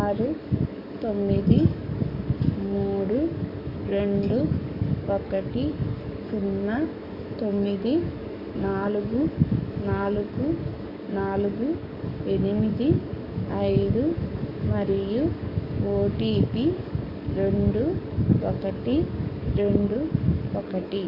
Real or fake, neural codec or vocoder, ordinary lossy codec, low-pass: fake; codec, 44.1 kHz, 7.8 kbps, DAC; none; 5.4 kHz